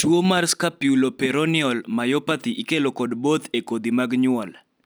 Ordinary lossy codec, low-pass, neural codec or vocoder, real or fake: none; none; none; real